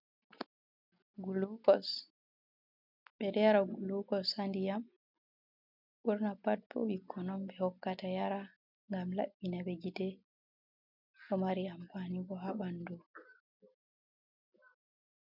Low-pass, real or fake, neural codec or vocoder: 5.4 kHz; real; none